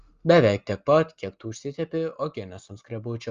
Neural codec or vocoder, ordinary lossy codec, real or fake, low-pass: none; Opus, 32 kbps; real; 7.2 kHz